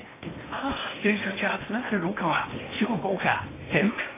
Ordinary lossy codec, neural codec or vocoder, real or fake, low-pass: AAC, 16 kbps; codec, 16 kHz in and 24 kHz out, 0.8 kbps, FocalCodec, streaming, 65536 codes; fake; 3.6 kHz